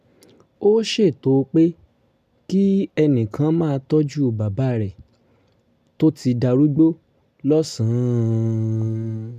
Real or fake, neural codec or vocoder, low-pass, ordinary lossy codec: real; none; 14.4 kHz; none